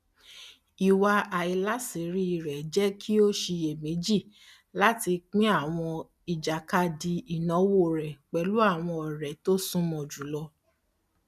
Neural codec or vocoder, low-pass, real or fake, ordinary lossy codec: none; 14.4 kHz; real; none